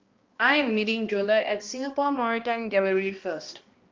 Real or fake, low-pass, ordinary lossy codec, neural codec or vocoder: fake; 7.2 kHz; Opus, 32 kbps; codec, 16 kHz, 1 kbps, X-Codec, HuBERT features, trained on balanced general audio